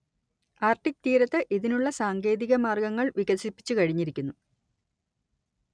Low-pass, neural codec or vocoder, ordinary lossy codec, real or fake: 9.9 kHz; none; none; real